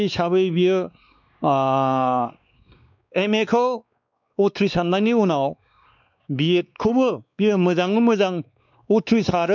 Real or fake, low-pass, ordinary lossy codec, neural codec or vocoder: fake; 7.2 kHz; none; codec, 16 kHz, 2 kbps, X-Codec, WavLM features, trained on Multilingual LibriSpeech